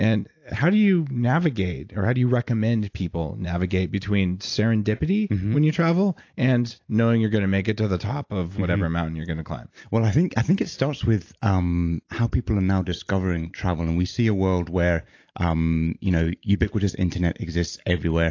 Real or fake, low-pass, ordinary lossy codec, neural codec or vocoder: real; 7.2 kHz; AAC, 48 kbps; none